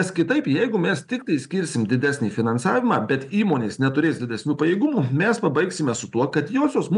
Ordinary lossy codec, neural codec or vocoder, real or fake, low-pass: MP3, 96 kbps; none; real; 10.8 kHz